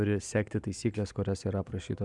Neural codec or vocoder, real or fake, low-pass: none; real; 10.8 kHz